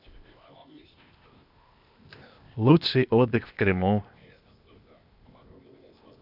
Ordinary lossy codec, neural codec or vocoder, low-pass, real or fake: none; codec, 16 kHz, 0.8 kbps, ZipCodec; 5.4 kHz; fake